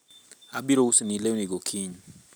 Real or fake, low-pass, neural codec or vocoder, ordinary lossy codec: real; none; none; none